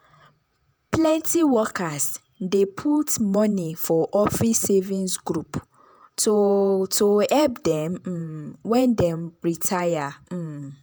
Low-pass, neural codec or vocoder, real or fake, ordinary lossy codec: none; vocoder, 48 kHz, 128 mel bands, Vocos; fake; none